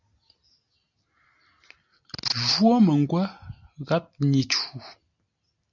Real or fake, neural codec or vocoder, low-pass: real; none; 7.2 kHz